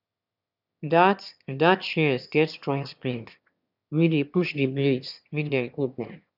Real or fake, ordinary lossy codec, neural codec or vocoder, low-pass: fake; none; autoencoder, 22.05 kHz, a latent of 192 numbers a frame, VITS, trained on one speaker; 5.4 kHz